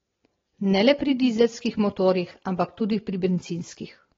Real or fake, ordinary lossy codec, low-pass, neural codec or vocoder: real; AAC, 24 kbps; 7.2 kHz; none